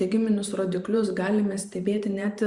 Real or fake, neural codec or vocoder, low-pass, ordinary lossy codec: fake; vocoder, 44.1 kHz, 128 mel bands every 512 samples, BigVGAN v2; 10.8 kHz; Opus, 64 kbps